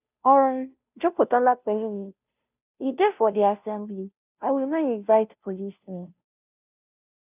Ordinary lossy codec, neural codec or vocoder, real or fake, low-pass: none; codec, 16 kHz, 0.5 kbps, FunCodec, trained on Chinese and English, 25 frames a second; fake; 3.6 kHz